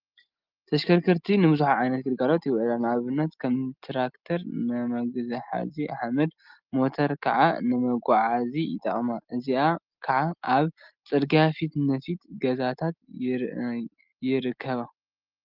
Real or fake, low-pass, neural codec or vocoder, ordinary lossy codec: real; 5.4 kHz; none; Opus, 32 kbps